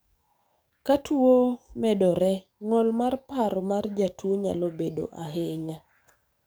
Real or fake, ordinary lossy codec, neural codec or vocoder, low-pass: fake; none; codec, 44.1 kHz, 7.8 kbps, DAC; none